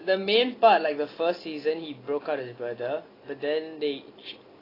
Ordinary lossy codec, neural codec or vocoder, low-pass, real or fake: AAC, 24 kbps; none; 5.4 kHz; real